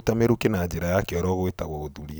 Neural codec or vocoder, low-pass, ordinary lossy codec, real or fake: none; none; none; real